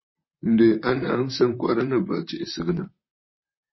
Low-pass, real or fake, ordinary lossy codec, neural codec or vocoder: 7.2 kHz; fake; MP3, 24 kbps; vocoder, 44.1 kHz, 128 mel bands, Pupu-Vocoder